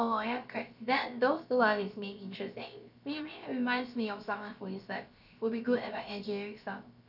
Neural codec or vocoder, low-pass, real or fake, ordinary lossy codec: codec, 16 kHz, about 1 kbps, DyCAST, with the encoder's durations; 5.4 kHz; fake; none